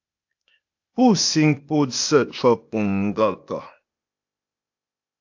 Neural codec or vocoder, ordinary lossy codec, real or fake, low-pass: codec, 16 kHz, 0.8 kbps, ZipCodec; AAC, 48 kbps; fake; 7.2 kHz